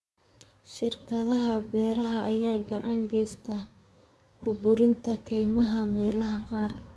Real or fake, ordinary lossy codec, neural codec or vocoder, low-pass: fake; none; codec, 24 kHz, 1 kbps, SNAC; none